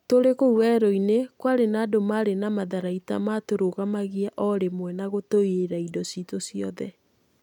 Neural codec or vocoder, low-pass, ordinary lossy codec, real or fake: none; 19.8 kHz; none; real